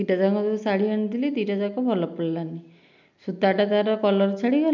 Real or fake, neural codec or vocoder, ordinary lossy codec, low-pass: real; none; MP3, 64 kbps; 7.2 kHz